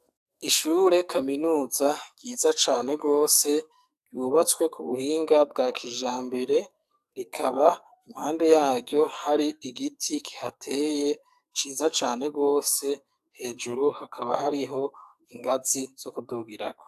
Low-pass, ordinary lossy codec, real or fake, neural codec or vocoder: 14.4 kHz; AAC, 96 kbps; fake; codec, 44.1 kHz, 2.6 kbps, SNAC